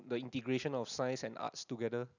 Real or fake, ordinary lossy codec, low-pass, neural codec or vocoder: real; none; 7.2 kHz; none